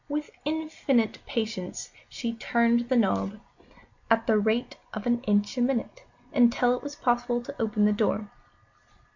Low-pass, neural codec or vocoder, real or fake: 7.2 kHz; none; real